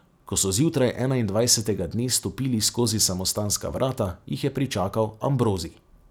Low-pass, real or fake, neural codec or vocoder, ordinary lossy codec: none; real; none; none